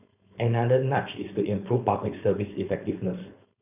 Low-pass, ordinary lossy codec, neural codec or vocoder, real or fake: 3.6 kHz; none; codec, 16 kHz, 4.8 kbps, FACodec; fake